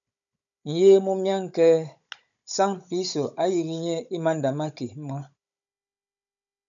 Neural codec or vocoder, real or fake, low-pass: codec, 16 kHz, 16 kbps, FunCodec, trained on Chinese and English, 50 frames a second; fake; 7.2 kHz